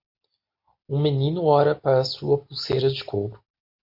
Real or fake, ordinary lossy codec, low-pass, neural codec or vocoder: real; MP3, 32 kbps; 5.4 kHz; none